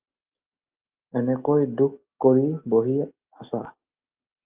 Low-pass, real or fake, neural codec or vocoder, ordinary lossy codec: 3.6 kHz; real; none; Opus, 32 kbps